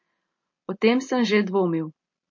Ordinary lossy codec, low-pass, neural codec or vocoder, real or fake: MP3, 32 kbps; 7.2 kHz; none; real